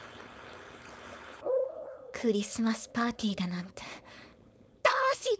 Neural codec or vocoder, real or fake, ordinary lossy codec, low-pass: codec, 16 kHz, 4.8 kbps, FACodec; fake; none; none